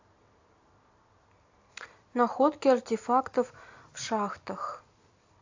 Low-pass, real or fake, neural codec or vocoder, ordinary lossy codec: 7.2 kHz; real; none; AAC, 48 kbps